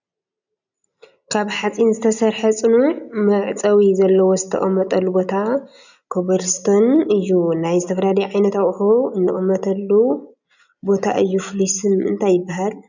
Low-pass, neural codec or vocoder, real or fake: 7.2 kHz; none; real